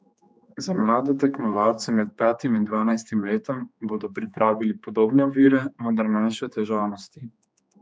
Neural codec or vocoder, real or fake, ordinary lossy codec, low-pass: codec, 16 kHz, 2 kbps, X-Codec, HuBERT features, trained on general audio; fake; none; none